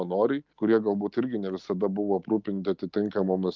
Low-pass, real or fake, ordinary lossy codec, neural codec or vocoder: 7.2 kHz; real; Opus, 24 kbps; none